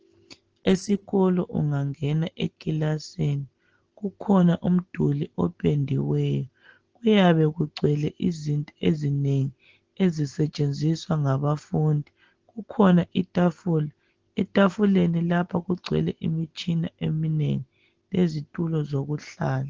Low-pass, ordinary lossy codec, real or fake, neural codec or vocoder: 7.2 kHz; Opus, 16 kbps; real; none